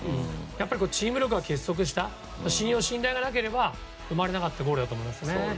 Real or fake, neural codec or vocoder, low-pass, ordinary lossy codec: real; none; none; none